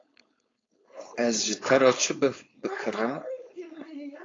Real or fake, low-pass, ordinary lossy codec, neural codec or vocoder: fake; 7.2 kHz; AAC, 32 kbps; codec, 16 kHz, 4.8 kbps, FACodec